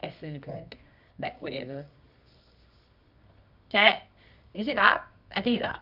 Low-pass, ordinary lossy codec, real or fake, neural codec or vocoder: 5.4 kHz; none; fake; codec, 24 kHz, 0.9 kbps, WavTokenizer, medium music audio release